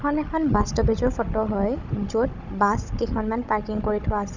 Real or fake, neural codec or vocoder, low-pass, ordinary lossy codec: fake; codec, 16 kHz, 16 kbps, FunCodec, trained on Chinese and English, 50 frames a second; 7.2 kHz; none